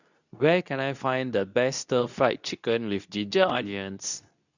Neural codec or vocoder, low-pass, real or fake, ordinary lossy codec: codec, 24 kHz, 0.9 kbps, WavTokenizer, medium speech release version 2; 7.2 kHz; fake; none